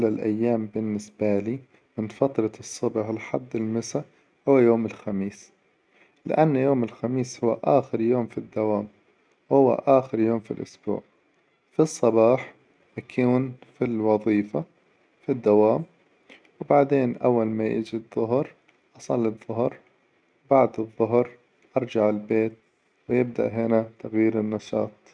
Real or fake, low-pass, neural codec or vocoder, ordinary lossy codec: real; 9.9 kHz; none; none